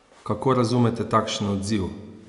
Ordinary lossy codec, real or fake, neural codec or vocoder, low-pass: none; real; none; 10.8 kHz